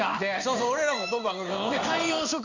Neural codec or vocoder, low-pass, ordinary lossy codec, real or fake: codec, 16 kHz in and 24 kHz out, 1 kbps, XY-Tokenizer; 7.2 kHz; none; fake